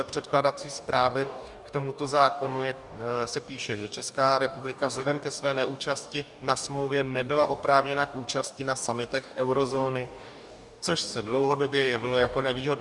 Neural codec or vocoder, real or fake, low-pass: codec, 44.1 kHz, 2.6 kbps, DAC; fake; 10.8 kHz